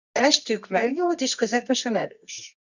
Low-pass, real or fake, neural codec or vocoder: 7.2 kHz; fake; codec, 24 kHz, 0.9 kbps, WavTokenizer, medium music audio release